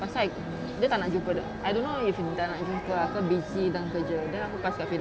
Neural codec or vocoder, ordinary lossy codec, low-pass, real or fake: none; none; none; real